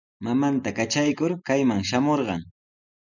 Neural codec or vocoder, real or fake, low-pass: none; real; 7.2 kHz